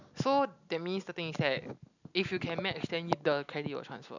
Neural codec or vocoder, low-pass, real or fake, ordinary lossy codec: none; 7.2 kHz; real; none